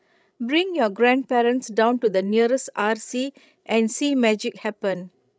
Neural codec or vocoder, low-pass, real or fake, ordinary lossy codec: codec, 16 kHz, 16 kbps, FreqCodec, larger model; none; fake; none